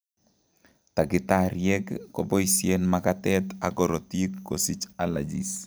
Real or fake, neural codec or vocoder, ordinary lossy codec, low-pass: real; none; none; none